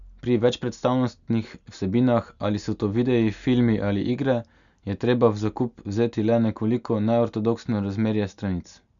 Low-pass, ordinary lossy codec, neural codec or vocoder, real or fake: 7.2 kHz; none; none; real